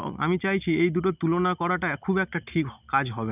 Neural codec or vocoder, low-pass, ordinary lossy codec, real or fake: none; 3.6 kHz; none; real